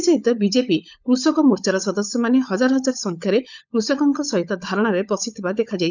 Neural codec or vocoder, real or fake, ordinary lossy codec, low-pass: codec, 16 kHz, 16 kbps, FunCodec, trained on LibriTTS, 50 frames a second; fake; none; 7.2 kHz